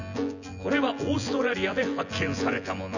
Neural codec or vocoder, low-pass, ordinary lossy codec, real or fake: vocoder, 24 kHz, 100 mel bands, Vocos; 7.2 kHz; none; fake